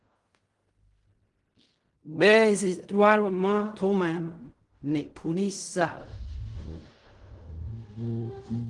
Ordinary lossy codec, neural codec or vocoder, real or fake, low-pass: Opus, 24 kbps; codec, 16 kHz in and 24 kHz out, 0.4 kbps, LongCat-Audio-Codec, fine tuned four codebook decoder; fake; 10.8 kHz